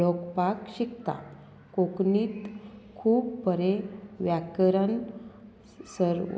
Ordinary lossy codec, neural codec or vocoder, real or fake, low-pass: none; none; real; none